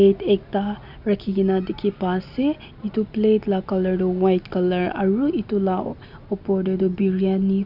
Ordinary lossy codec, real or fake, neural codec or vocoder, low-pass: none; real; none; 5.4 kHz